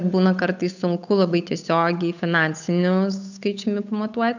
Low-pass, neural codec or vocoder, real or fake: 7.2 kHz; codec, 16 kHz, 8 kbps, FunCodec, trained on Chinese and English, 25 frames a second; fake